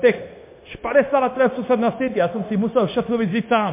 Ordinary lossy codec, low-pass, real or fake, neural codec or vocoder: MP3, 24 kbps; 3.6 kHz; fake; codec, 16 kHz, 0.9 kbps, LongCat-Audio-Codec